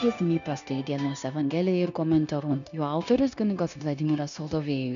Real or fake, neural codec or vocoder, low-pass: fake; codec, 16 kHz, 0.9 kbps, LongCat-Audio-Codec; 7.2 kHz